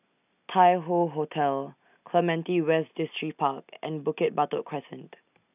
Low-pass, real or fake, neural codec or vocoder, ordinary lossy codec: 3.6 kHz; real; none; none